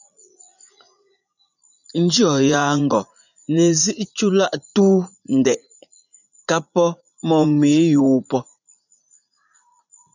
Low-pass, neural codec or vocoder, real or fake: 7.2 kHz; vocoder, 44.1 kHz, 80 mel bands, Vocos; fake